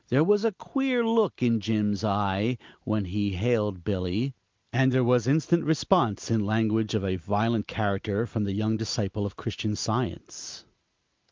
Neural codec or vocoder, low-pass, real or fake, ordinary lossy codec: none; 7.2 kHz; real; Opus, 24 kbps